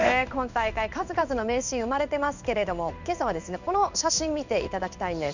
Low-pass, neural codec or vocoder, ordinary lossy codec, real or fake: 7.2 kHz; codec, 16 kHz in and 24 kHz out, 1 kbps, XY-Tokenizer; none; fake